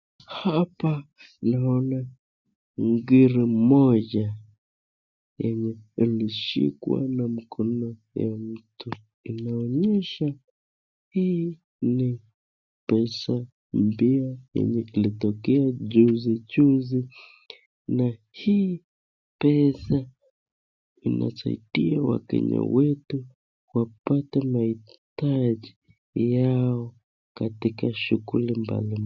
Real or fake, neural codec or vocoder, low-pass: real; none; 7.2 kHz